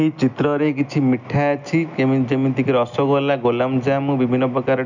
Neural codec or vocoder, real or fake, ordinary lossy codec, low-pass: none; real; none; 7.2 kHz